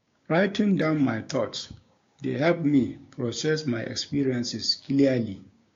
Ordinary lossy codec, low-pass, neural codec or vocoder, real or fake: AAC, 48 kbps; 7.2 kHz; codec, 16 kHz, 6 kbps, DAC; fake